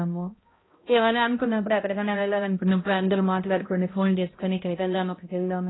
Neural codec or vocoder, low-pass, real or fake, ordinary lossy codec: codec, 16 kHz, 0.5 kbps, X-Codec, HuBERT features, trained on balanced general audio; 7.2 kHz; fake; AAC, 16 kbps